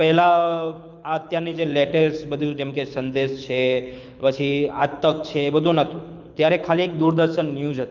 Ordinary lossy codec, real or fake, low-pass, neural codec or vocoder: none; fake; 7.2 kHz; codec, 24 kHz, 6 kbps, HILCodec